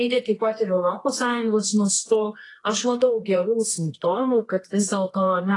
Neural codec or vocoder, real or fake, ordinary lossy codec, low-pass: codec, 24 kHz, 0.9 kbps, WavTokenizer, medium music audio release; fake; AAC, 32 kbps; 10.8 kHz